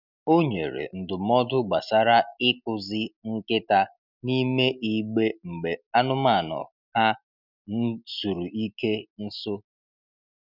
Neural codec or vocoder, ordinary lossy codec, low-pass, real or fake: none; none; 5.4 kHz; real